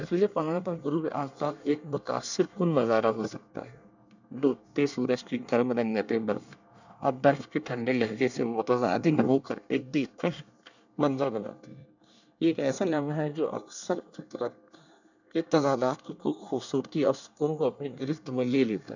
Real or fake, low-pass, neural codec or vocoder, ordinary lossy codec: fake; 7.2 kHz; codec, 24 kHz, 1 kbps, SNAC; none